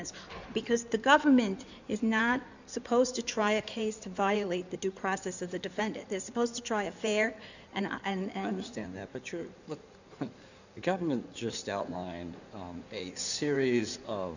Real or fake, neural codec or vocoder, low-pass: fake; codec, 16 kHz in and 24 kHz out, 2.2 kbps, FireRedTTS-2 codec; 7.2 kHz